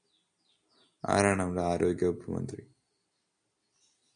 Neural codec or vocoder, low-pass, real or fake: none; 9.9 kHz; real